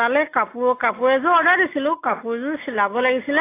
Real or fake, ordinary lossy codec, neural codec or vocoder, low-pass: real; AAC, 24 kbps; none; 3.6 kHz